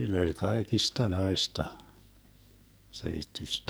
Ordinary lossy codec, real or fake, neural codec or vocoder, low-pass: none; fake; codec, 44.1 kHz, 2.6 kbps, SNAC; none